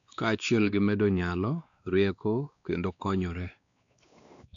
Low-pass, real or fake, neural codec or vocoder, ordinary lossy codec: 7.2 kHz; fake; codec, 16 kHz, 2 kbps, X-Codec, WavLM features, trained on Multilingual LibriSpeech; none